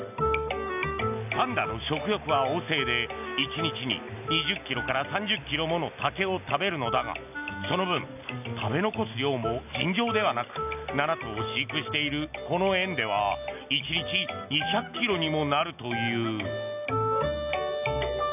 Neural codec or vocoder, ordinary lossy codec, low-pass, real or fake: none; none; 3.6 kHz; real